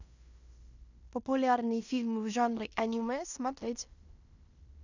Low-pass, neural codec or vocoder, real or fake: 7.2 kHz; codec, 16 kHz in and 24 kHz out, 0.9 kbps, LongCat-Audio-Codec, fine tuned four codebook decoder; fake